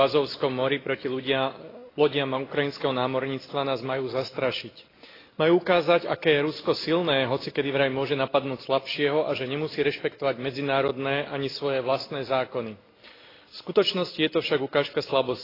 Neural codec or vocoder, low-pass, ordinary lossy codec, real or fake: none; 5.4 kHz; AAC, 24 kbps; real